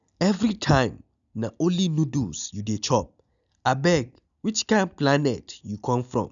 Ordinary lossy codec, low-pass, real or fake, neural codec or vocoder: none; 7.2 kHz; real; none